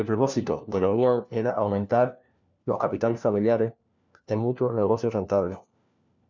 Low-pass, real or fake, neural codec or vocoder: 7.2 kHz; fake; codec, 16 kHz, 1 kbps, FunCodec, trained on LibriTTS, 50 frames a second